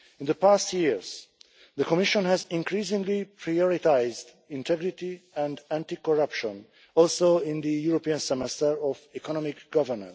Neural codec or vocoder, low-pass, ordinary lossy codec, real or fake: none; none; none; real